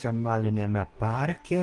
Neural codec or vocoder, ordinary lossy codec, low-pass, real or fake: codec, 44.1 kHz, 2.6 kbps, DAC; Opus, 24 kbps; 10.8 kHz; fake